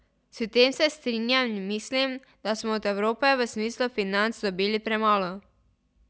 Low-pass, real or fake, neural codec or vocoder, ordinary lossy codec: none; real; none; none